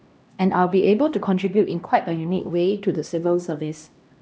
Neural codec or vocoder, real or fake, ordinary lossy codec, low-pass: codec, 16 kHz, 1 kbps, X-Codec, HuBERT features, trained on LibriSpeech; fake; none; none